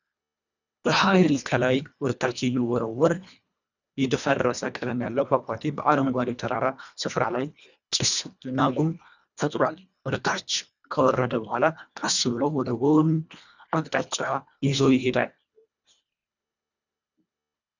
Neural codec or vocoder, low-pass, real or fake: codec, 24 kHz, 1.5 kbps, HILCodec; 7.2 kHz; fake